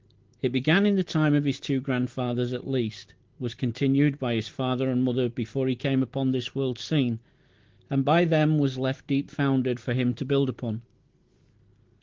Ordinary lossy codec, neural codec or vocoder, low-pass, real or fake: Opus, 16 kbps; none; 7.2 kHz; real